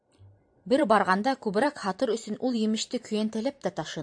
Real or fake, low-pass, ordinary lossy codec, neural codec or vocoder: fake; 9.9 kHz; AAC, 64 kbps; vocoder, 44.1 kHz, 128 mel bands every 512 samples, BigVGAN v2